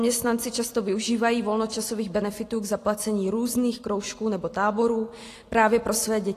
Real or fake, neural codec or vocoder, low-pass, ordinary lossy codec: real; none; 14.4 kHz; AAC, 48 kbps